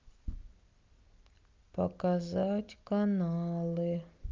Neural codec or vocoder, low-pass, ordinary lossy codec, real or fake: none; 7.2 kHz; Opus, 24 kbps; real